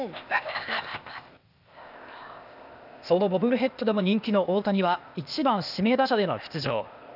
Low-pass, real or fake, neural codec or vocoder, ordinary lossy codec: 5.4 kHz; fake; codec, 16 kHz, 0.8 kbps, ZipCodec; none